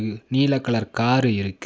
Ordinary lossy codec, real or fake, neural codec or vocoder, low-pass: none; real; none; none